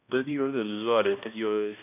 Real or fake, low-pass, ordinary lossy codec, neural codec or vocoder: fake; 3.6 kHz; none; codec, 16 kHz, 1 kbps, X-Codec, HuBERT features, trained on balanced general audio